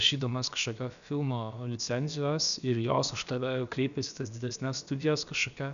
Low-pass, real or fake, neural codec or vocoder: 7.2 kHz; fake; codec, 16 kHz, about 1 kbps, DyCAST, with the encoder's durations